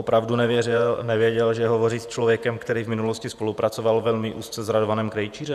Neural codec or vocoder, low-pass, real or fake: vocoder, 48 kHz, 128 mel bands, Vocos; 14.4 kHz; fake